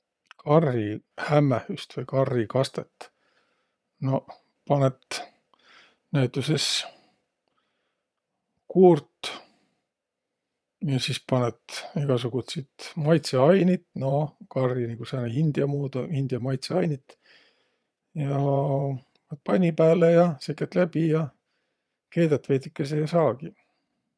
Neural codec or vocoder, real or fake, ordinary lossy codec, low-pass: vocoder, 22.05 kHz, 80 mel bands, Vocos; fake; none; none